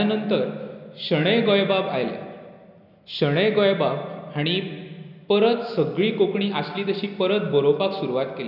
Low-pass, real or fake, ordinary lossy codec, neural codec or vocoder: 5.4 kHz; real; none; none